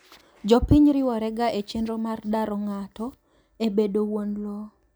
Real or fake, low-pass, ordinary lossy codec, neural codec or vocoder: real; none; none; none